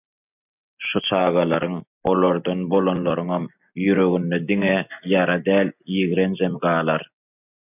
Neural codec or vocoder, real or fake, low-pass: none; real; 3.6 kHz